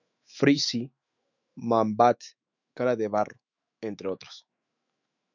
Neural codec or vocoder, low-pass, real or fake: autoencoder, 48 kHz, 128 numbers a frame, DAC-VAE, trained on Japanese speech; 7.2 kHz; fake